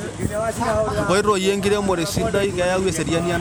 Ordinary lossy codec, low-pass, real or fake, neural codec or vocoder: none; none; real; none